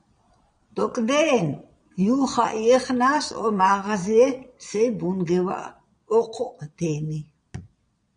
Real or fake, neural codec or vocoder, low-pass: fake; vocoder, 22.05 kHz, 80 mel bands, Vocos; 9.9 kHz